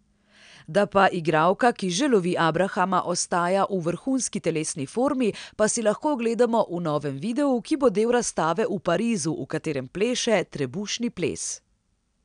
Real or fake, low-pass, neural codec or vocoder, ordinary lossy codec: real; 9.9 kHz; none; none